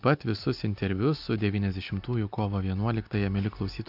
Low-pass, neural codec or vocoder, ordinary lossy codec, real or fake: 5.4 kHz; none; AAC, 48 kbps; real